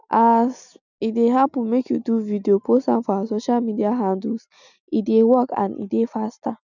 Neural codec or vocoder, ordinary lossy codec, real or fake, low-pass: none; none; real; 7.2 kHz